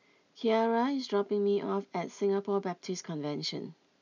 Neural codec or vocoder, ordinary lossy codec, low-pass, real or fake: none; none; 7.2 kHz; real